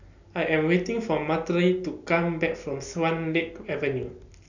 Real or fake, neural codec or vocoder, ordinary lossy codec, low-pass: real; none; none; 7.2 kHz